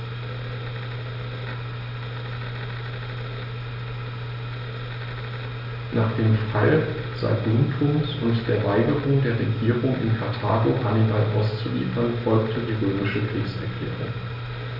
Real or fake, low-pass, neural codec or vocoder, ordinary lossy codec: real; 5.4 kHz; none; none